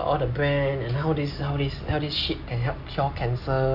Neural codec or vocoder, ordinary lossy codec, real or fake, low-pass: none; none; real; 5.4 kHz